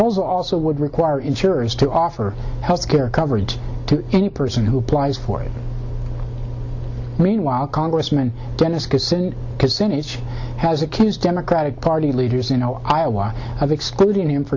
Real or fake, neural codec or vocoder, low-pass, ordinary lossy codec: real; none; 7.2 kHz; Opus, 64 kbps